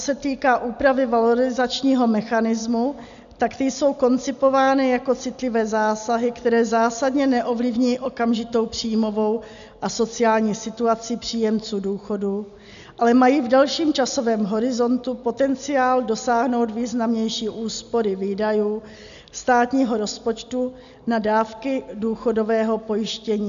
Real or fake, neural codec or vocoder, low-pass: real; none; 7.2 kHz